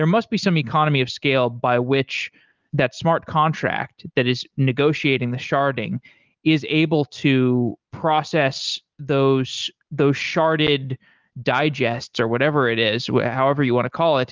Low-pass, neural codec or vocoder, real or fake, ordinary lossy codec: 7.2 kHz; none; real; Opus, 32 kbps